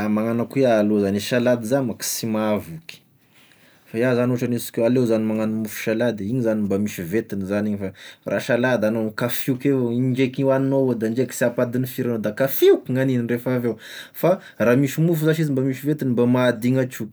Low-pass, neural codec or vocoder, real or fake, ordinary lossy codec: none; none; real; none